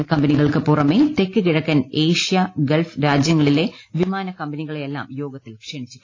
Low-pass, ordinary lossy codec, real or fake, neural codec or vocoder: 7.2 kHz; AAC, 32 kbps; real; none